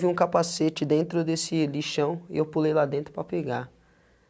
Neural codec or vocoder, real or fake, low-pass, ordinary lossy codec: none; real; none; none